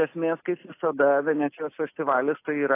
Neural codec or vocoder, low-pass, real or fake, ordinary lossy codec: none; 3.6 kHz; real; AAC, 24 kbps